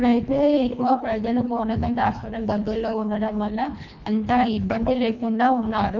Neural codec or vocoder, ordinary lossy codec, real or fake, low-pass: codec, 24 kHz, 1.5 kbps, HILCodec; none; fake; 7.2 kHz